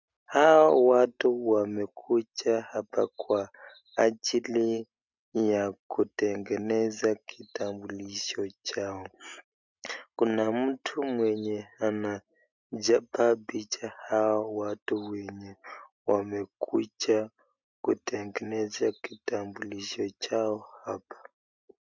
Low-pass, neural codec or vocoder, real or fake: 7.2 kHz; none; real